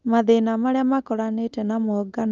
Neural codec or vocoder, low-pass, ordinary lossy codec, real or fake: none; 7.2 kHz; Opus, 32 kbps; real